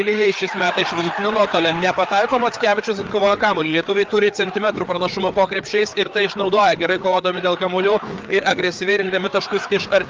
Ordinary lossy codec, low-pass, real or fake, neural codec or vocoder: Opus, 24 kbps; 7.2 kHz; fake; codec, 16 kHz, 4 kbps, FreqCodec, larger model